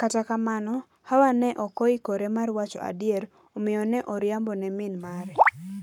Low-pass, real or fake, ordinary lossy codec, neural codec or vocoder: 19.8 kHz; fake; none; codec, 44.1 kHz, 7.8 kbps, Pupu-Codec